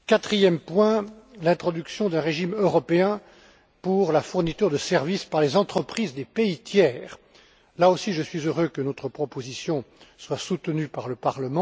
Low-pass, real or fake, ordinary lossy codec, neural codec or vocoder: none; real; none; none